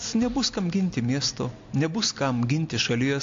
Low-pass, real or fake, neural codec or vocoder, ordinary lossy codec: 7.2 kHz; real; none; AAC, 48 kbps